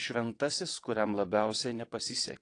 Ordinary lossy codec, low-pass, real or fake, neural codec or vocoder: AAC, 48 kbps; 9.9 kHz; fake; vocoder, 22.05 kHz, 80 mel bands, WaveNeXt